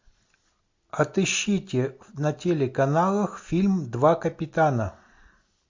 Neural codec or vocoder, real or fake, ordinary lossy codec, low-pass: none; real; MP3, 48 kbps; 7.2 kHz